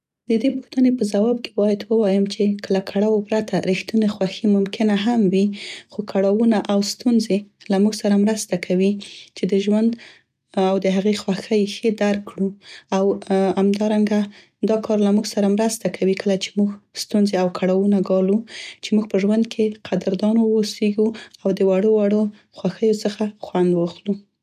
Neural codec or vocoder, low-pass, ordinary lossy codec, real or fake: none; 14.4 kHz; none; real